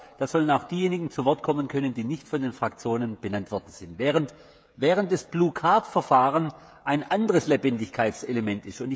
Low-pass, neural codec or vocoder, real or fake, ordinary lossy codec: none; codec, 16 kHz, 16 kbps, FreqCodec, smaller model; fake; none